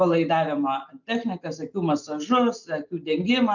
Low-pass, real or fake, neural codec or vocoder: 7.2 kHz; real; none